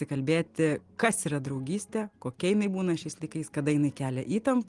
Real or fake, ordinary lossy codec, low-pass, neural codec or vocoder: real; Opus, 24 kbps; 10.8 kHz; none